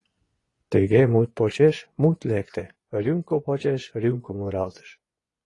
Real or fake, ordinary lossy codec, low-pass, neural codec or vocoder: fake; AAC, 32 kbps; 10.8 kHz; vocoder, 24 kHz, 100 mel bands, Vocos